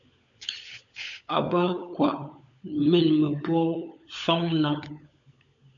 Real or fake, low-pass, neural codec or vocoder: fake; 7.2 kHz; codec, 16 kHz, 16 kbps, FunCodec, trained on LibriTTS, 50 frames a second